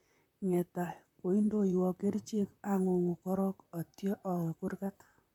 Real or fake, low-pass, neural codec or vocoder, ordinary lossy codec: fake; 19.8 kHz; vocoder, 44.1 kHz, 128 mel bands, Pupu-Vocoder; MP3, 96 kbps